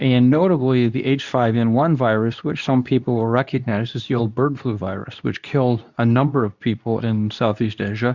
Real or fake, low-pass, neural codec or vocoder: fake; 7.2 kHz; codec, 24 kHz, 0.9 kbps, WavTokenizer, medium speech release version 2